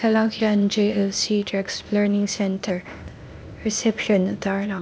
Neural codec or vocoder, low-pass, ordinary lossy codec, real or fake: codec, 16 kHz, 0.8 kbps, ZipCodec; none; none; fake